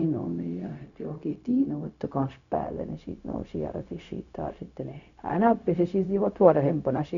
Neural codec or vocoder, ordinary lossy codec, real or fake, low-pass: codec, 16 kHz, 0.4 kbps, LongCat-Audio-Codec; none; fake; 7.2 kHz